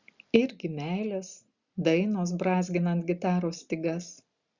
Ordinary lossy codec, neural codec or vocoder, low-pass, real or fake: Opus, 64 kbps; none; 7.2 kHz; real